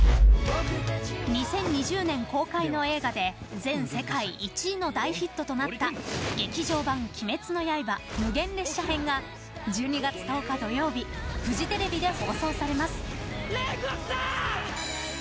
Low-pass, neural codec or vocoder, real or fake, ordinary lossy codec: none; none; real; none